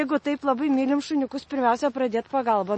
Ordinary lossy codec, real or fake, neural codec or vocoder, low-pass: MP3, 32 kbps; real; none; 10.8 kHz